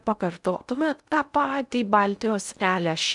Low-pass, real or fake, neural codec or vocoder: 10.8 kHz; fake; codec, 16 kHz in and 24 kHz out, 0.6 kbps, FocalCodec, streaming, 2048 codes